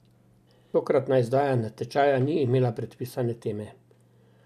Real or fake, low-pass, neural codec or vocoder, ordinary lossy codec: real; 14.4 kHz; none; none